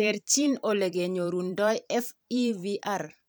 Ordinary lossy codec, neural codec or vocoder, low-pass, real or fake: none; vocoder, 44.1 kHz, 128 mel bands every 512 samples, BigVGAN v2; none; fake